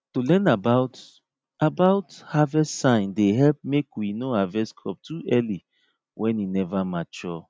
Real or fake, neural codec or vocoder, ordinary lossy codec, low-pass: real; none; none; none